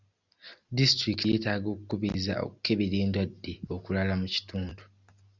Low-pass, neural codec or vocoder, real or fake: 7.2 kHz; none; real